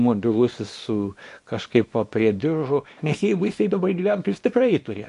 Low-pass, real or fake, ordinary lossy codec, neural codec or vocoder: 10.8 kHz; fake; MP3, 48 kbps; codec, 24 kHz, 0.9 kbps, WavTokenizer, small release